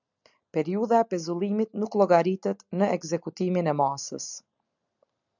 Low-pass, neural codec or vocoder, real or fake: 7.2 kHz; none; real